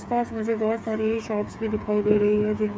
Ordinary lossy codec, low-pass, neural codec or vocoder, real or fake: none; none; codec, 16 kHz, 8 kbps, FreqCodec, smaller model; fake